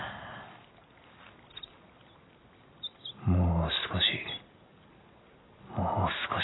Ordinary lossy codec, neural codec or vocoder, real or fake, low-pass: AAC, 16 kbps; none; real; 7.2 kHz